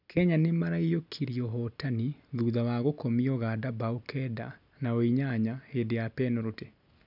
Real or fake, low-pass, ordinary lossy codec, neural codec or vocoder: real; 5.4 kHz; none; none